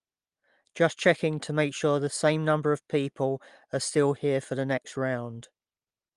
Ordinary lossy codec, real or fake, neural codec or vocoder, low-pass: Opus, 32 kbps; real; none; 10.8 kHz